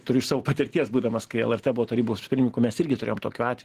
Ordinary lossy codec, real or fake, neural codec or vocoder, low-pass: Opus, 16 kbps; real; none; 14.4 kHz